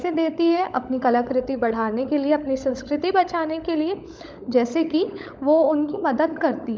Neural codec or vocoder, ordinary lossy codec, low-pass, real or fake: codec, 16 kHz, 16 kbps, FunCodec, trained on LibriTTS, 50 frames a second; none; none; fake